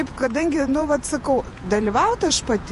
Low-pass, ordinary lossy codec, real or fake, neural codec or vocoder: 14.4 kHz; MP3, 48 kbps; fake; vocoder, 48 kHz, 128 mel bands, Vocos